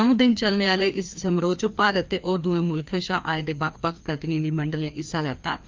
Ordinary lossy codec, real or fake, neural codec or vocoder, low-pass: Opus, 24 kbps; fake; codec, 16 kHz, 2 kbps, FreqCodec, larger model; 7.2 kHz